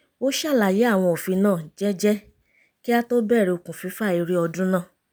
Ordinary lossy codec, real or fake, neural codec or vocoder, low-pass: none; real; none; none